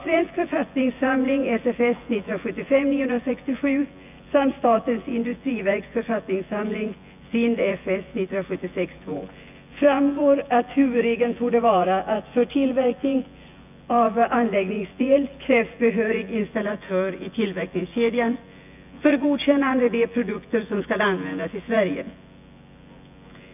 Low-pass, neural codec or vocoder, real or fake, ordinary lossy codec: 3.6 kHz; vocoder, 24 kHz, 100 mel bands, Vocos; fake; none